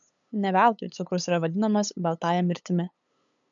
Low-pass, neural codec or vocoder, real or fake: 7.2 kHz; codec, 16 kHz, 8 kbps, FunCodec, trained on LibriTTS, 25 frames a second; fake